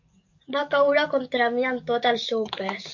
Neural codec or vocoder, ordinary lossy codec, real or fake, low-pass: codec, 44.1 kHz, 7.8 kbps, DAC; MP3, 48 kbps; fake; 7.2 kHz